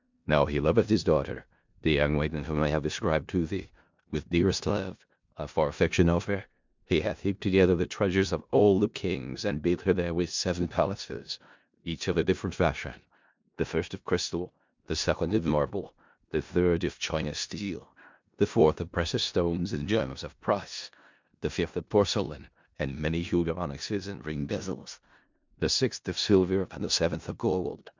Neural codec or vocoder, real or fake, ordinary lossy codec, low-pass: codec, 16 kHz in and 24 kHz out, 0.4 kbps, LongCat-Audio-Codec, four codebook decoder; fake; MP3, 64 kbps; 7.2 kHz